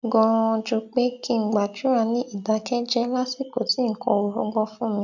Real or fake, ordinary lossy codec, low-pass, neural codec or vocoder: real; none; 7.2 kHz; none